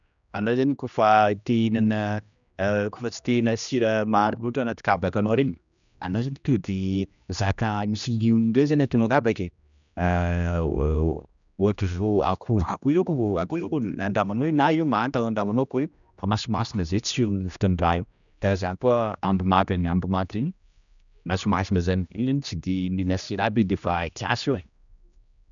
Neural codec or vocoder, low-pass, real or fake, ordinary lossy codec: codec, 16 kHz, 1 kbps, X-Codec, HuBERT features, trained on general audio; 7.2 kHz; fake; none